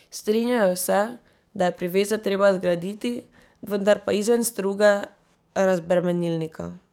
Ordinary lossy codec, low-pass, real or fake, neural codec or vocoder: none; 19.8 kHz; fake; codec, 44.1 kHz, 7.8 kbps, DAC